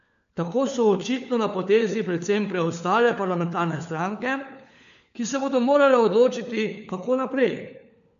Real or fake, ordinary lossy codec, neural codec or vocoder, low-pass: fake; AAC, 96 kbps; codec, 16 kHz, 4 kbps, FunCodec, trained on LibriTTS, 50 frames a second; 7.2 kHz